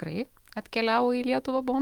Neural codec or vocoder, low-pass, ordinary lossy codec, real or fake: autoencoder, 48 kHz, 128 numbers a frame, DAC-VAE, trained on Japanese speech; 19.8 kHz; Opus, 24 kbps; fake